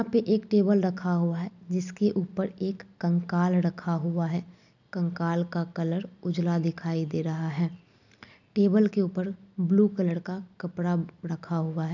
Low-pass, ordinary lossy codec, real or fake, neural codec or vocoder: 7.2 kHz; none; real; none